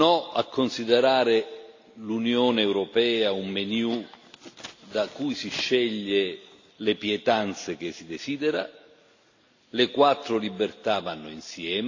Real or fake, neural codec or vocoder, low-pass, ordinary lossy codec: real; none; 7.2 kHz; AAC, 48 kbps